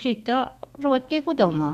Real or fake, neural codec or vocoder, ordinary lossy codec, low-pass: fake; codec, 32 kHz, 1.9 kbps, SNAC; none; 14.4 kHz